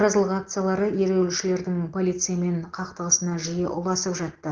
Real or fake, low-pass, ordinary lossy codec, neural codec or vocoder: real; 7.2 kHz; Opus, 16 kbps; none